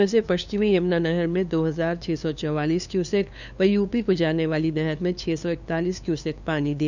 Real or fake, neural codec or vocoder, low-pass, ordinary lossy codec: fake; codec, 16 kHz, 2 kbps, FunCodec, trained on LibriTTS, 25 frames a second; 7.2 kHz; none